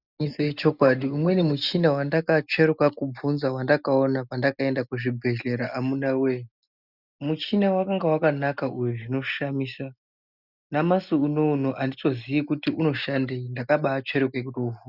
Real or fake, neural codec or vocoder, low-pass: real; none; 5.4 kHz